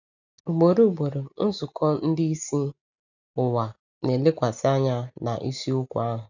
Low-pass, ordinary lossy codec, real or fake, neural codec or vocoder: 7.2 kHz; none; real; none